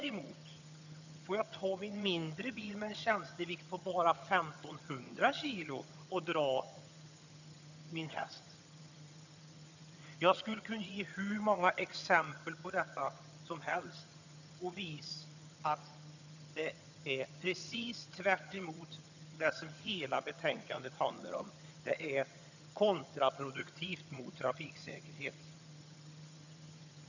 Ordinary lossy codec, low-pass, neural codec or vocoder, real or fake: none; 7.2 kHz; vocoder, 22.05 kHz, 80 mel bands, HiFi-GAN; fake